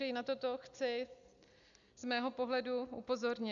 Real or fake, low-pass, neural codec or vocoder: real; 7.2 kHz; none